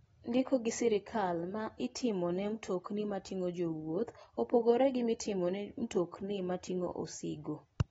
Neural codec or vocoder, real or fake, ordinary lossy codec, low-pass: none; real; AAC, 24 kbps; 19.8 kHz